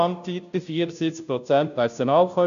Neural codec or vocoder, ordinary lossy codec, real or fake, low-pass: codec, 16 kHz, 0.5 kbps, FunCodec, trained on Chinese and English, 25 frames a second; none; fake; 7.2 kHz